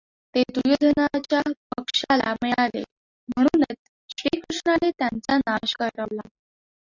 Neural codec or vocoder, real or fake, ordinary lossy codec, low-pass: none; real; Opus, 64 kbps; 7.2 kHz